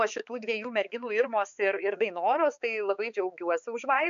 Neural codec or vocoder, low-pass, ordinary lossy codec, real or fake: codec, 16 kHz, 4 kbps, X-Codec, HuBERT features, trained on balanced general audio; 7.2 kHz; MP3, 64 kbps; fake